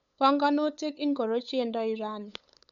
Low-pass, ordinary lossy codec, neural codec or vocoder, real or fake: 7.2 kHz; none; codec, 16 kHz, 8 kbps, FunCodec, trained on LibriTTS, 25 frames a second; fake